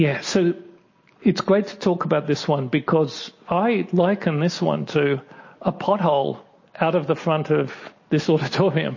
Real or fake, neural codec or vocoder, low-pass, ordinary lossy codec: real; none; 7.2 kHz; MP3, 32 kbps